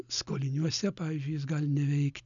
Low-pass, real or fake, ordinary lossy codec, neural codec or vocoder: 7.2 kHz; real; MP3, 96 kbps; none